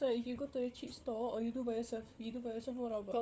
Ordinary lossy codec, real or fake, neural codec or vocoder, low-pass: none; fake; codec, 16 kHz, 4 kbps, FunCodec, trained on Chinese and English, 50 frames a second; none